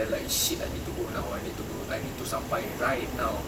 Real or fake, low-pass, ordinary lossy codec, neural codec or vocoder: fake; 19.8 kHz; none; vocoder, 44.1 kHz, 128 mel bands, Pupu-Vocoder